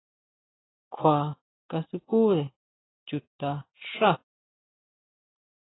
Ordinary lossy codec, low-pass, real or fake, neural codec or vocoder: AAC, 16 kbps; 7.2 kHz; real; none